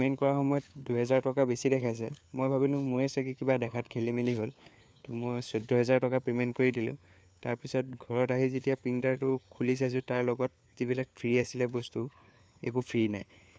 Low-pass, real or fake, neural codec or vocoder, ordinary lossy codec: none; fake; codec, 16 kHz, 4 kbps, FunCodec, trained on LibriTTS, 50 frames a second; none